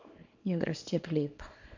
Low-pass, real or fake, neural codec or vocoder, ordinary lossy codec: 7.2 kHz; fake; codec, 24 kHz, 0.9 kbps, WavTokenizer, small release; MP3, 48 kbps